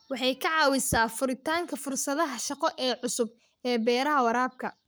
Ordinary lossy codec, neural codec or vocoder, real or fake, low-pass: none; codec, 44.1 kHz, 7.8 kbps, Pupu-Codec; fake; none